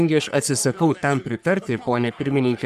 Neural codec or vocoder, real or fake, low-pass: codec, 44.1 kHz, 3.4 kbps, Pupu-Codec; fake; 14.4 kHz